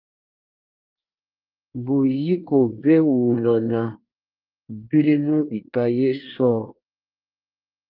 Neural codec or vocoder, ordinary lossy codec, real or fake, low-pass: codec, 24 kHz, 1 kbps, SNAC; Opus, 32 kbps; fake; 5.4 kHz